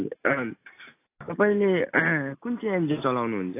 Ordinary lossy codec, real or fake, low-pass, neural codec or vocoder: AAC, 24 kbps; real; 3.6 kHz; none